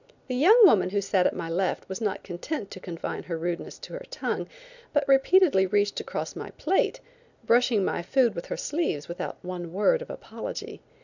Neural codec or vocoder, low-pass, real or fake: none; 7.2 kHz; real